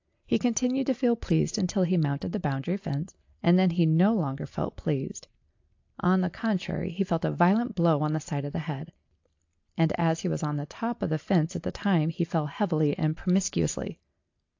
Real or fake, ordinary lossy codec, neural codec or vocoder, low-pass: real; AAC, 48 kbps; none; 7.2 kHz